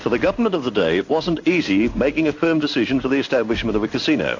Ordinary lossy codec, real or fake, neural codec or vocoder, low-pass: AAC, 48 kbps; fake; codec, 16 kHz in and 24 kHz out, 1 kbps, XY-Tokenizer; 7.2 kHz